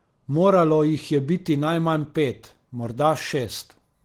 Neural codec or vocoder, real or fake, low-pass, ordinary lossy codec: none; real; 19.8 kHz; Opus, 16 kbps